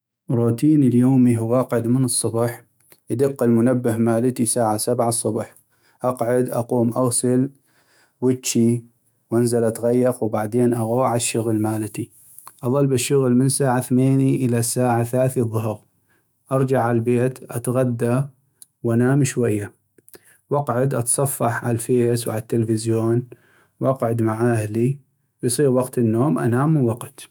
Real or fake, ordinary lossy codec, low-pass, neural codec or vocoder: fake; none; none; autoencoder, 48 kHz, 128 numbers a frame, DAC-VAE, trained on Japanese speech